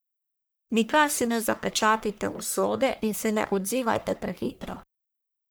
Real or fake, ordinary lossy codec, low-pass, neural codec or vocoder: fake; none; none; codec, 44.1 kHz, 1.7 kbps, Pupu-Codec